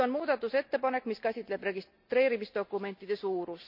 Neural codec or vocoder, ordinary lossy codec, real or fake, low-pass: none; none; real; 5.4 kHz